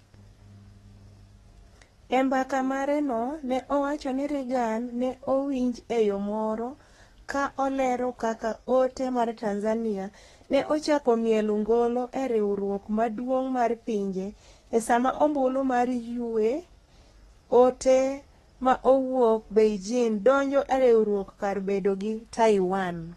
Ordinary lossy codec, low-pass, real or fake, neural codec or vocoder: AAC, 32 kbps; 14.4 kHz; fake; codec, 32 kHz, 1.9 kbps, SNAC